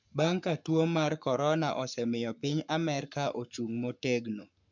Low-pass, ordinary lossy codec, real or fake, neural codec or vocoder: 7.2 kHz; MP3, 64 kbps; fake; codec, 44.1 kHz, 7.8 kbps, Pupu-Codec